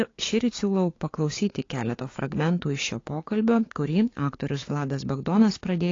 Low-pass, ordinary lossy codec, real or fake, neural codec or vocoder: 7.2 kHz; AAC, 32 kbps; fake; codec, 16 kHz, 6 kbps, DAC